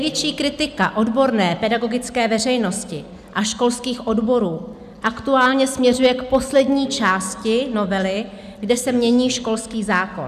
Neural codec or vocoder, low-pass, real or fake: none; 14.4 kHz; real